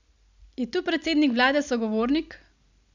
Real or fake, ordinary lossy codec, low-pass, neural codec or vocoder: real; none; 7.2 kHz; none